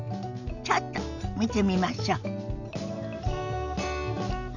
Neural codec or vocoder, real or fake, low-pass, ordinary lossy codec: none; real; 7.2 kHz; none